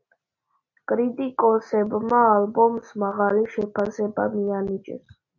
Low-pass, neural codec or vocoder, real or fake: 7.2 kHz; none; real